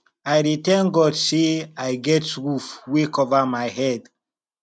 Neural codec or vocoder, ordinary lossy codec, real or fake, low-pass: none; none; real; 9.9 kHz